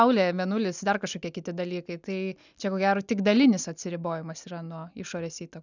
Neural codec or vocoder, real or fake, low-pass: none; real; 7.2 kHz